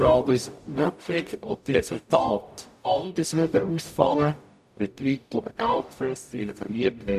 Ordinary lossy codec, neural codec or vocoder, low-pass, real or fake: none; codec, 44.1 kHz, 0.9 kbps, DAC; 14.4 kHz; fake